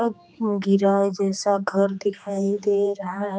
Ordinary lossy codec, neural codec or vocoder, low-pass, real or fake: none; codec, 16 kHz, 2 kbps, X-Codec, HuBERT features, trained on general audio; none; fake